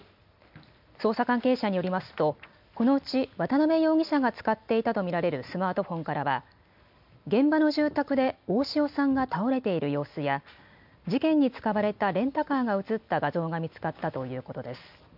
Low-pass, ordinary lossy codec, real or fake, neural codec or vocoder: 5.4 kHz; MP3, 48 kbps; real; none